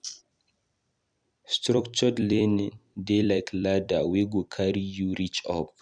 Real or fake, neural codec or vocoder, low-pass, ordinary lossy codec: fake; vocoder, 44.1 kHz, 128 mel bands every 256 samples, BigVGAN v2; 9.9 kHz; none